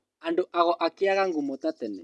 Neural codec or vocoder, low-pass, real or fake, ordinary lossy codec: none; none; real; none